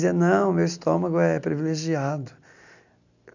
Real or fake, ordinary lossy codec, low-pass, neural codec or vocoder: real; none; 7.2 kHz; none